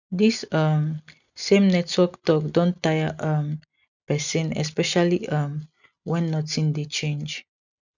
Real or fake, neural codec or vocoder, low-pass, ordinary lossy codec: real; none; 7.2 kHz; none